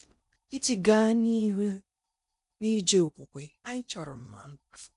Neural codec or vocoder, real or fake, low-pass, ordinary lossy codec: codec, 16 kHz in and 24 kHz out, 0.6 kbps, FocalCodec, streaming, 4096 codes; fake; 10.8 kHz; none